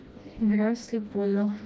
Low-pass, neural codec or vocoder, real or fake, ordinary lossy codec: none; codec, 16 kHz, 1 kbps, FreqCodec, smaller model; fake; none